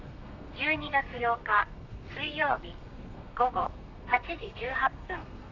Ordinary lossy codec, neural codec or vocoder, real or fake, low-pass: none; codec, 32 kHz, 1.9 kbps, SNAC; fake; 7.2 kHz